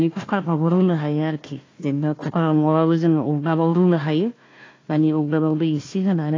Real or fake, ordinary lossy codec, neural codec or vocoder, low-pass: fake; AAC, 32 kbps; codec, 16 kHz, 1 kbps, FunCodec, trained on Chinese and English, 50 frames a second; 7.2 kHz